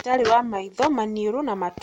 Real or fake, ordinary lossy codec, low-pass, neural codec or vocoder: real; MP3, 64 kbps; 19.8 kHz; none